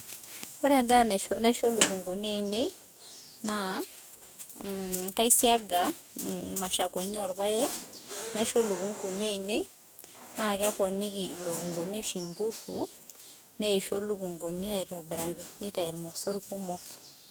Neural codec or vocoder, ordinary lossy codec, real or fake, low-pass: codec, 44.1 kHz, 2.6 kbps, DAC; none; fake; none